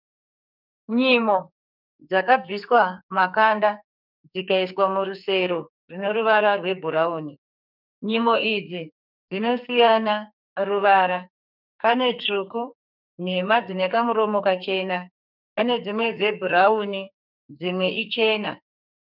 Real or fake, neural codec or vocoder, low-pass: fake; codec, 44.1 kHz, 2.6 kbps, SNAC; 5.4 kHz